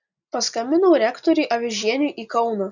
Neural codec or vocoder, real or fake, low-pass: none; real; 7.2 kHz